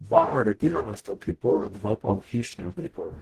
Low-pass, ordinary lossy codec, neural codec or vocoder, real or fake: 14.4 kHz; Opus, 16 kbps; codec, 44.1 kHz, 0.9 kbps, DAC; fake